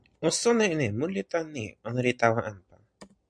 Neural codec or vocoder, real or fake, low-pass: none; real; 9.9 kHz